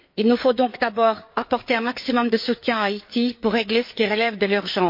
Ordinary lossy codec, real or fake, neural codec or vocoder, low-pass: MP3, 32 kbps; fake; codec, 16 kHz, 4 kbps, FreqCodec, larger model; 5.4 kHz